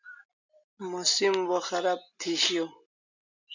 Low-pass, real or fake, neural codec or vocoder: 7.2 kHz; real; none